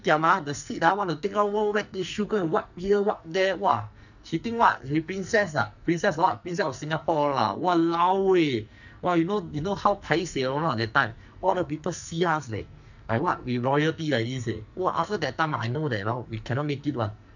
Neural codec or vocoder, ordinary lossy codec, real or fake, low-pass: codec, 44.1 kHz, 2.6 kbps, SNAC; none; fake; 7.2 kHz